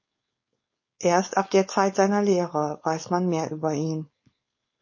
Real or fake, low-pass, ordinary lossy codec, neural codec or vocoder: fake; 7.2 kHz; MP3, 32 kbps; codec, 16 kHz, 4.8 kbps, FACodec